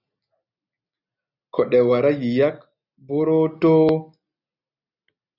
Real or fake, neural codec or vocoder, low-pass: real; none; 5.4 kHz